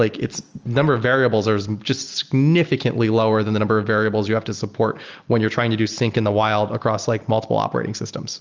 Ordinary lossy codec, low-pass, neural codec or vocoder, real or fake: Opus, 24 kbps; 7.2 kHz; none; real